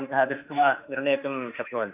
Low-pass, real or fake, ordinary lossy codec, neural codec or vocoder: 3.6 kHz; fake; none; autoencoder, 48 kHz, 32 numbers a frame, DAC-VAE, trained on Japanese speech